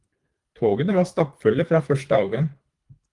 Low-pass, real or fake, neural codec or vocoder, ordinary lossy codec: 10.8 kHz; fake; codec, 24 kHz, 3 kbps, HILCodec; Opus, 24 kbps